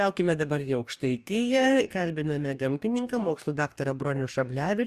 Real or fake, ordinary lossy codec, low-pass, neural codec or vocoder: fake; Opus, 64 kbps; 14.4 kHz; codec, 44.1 kHz, 2.6 kbps, DAC